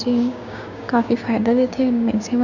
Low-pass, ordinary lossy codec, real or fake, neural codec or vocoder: 7.2 kHz; Opus, 64 kbps; fake; codec, 16 kHz, 6 kbps, DAC